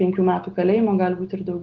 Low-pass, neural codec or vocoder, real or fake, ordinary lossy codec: 7.2 kHz; none; real; Opus, 16 kbps